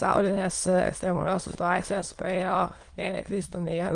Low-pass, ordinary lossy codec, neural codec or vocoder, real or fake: 9.9 kHz; Opus, 24 kbps; autoencoder, 22.05 kHz, a latent of 192 numbers a frame, VITS, trained on many speakers; fake